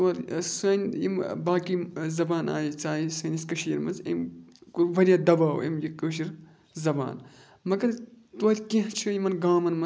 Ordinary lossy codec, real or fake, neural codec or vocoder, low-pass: none; real; none; none